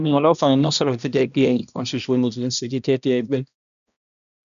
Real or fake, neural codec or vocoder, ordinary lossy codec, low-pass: fake; codec, 16 kHz, 1 kbps, X-Codec, HuBERT features, trained on balanced general audio; none; 7.2 kHz